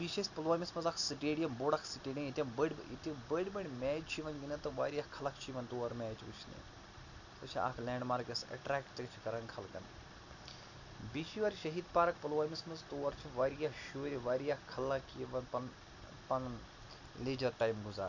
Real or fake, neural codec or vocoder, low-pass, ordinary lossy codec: real; none; 7.2 kHz; none